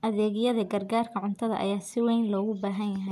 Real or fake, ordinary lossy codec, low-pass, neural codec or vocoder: real; none; 14.4 kHz; none